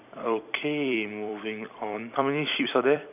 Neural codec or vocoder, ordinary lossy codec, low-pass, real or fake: vocoder, 44.1 kHz, 128 mel bands every 256 samples, BigVGAN v2; none; 3.6 kHz; fake